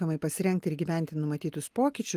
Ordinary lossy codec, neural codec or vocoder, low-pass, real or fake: Opus, 32 kbps; none; 14.4 kHz; real